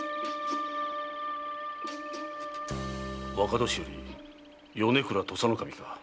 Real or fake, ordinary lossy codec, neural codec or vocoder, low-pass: real; none; none; none